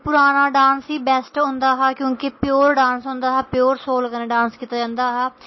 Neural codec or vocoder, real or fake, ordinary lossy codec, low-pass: none; real; MP3, 24 kbps; 7.2 kHz